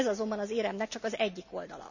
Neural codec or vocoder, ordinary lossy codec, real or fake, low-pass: none; none; real; 7.2 kHz